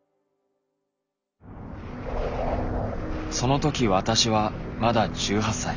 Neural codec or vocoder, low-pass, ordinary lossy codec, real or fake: none; 7.2 kHz; none; real